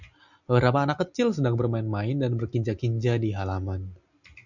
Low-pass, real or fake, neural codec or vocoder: 7.2 kHz; real; none